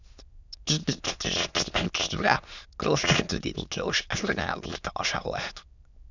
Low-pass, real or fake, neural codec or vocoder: 7.2 kHz; fake; autoencoder, 22.05 kHz, a latent of 192 numbers a frame, VITS, trained on many speakers